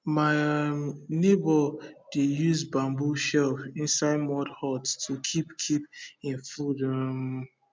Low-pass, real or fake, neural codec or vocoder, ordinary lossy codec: none; real; none; none